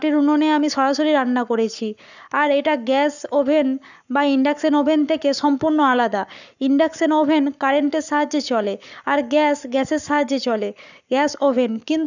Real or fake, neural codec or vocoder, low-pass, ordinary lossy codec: fake; codec, 44.1 kHz, 7.8 kbps, Pupu-Codec; 7.2 kHz; none